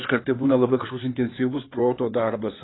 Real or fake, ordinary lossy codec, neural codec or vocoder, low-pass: fake; AAC, 16 kbps; codec, 16 kHz in and 24 kHz out, 2.2 kbps, FireRedTTS-2 codec; 7.2 kHz